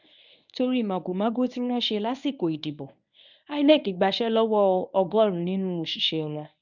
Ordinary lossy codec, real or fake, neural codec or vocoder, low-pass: none; fake; codec, 24 kHz, 0.9 kbps, WavTokenizer, medium speech release version 1; 7.2 kHz